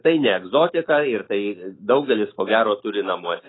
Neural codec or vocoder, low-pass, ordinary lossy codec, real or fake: autoencoder, 48 kHz, 128 numbers a frame, DAC-VAE, trained on Japanese speech; 7.2 kHz; AAC, 16 kbps; fake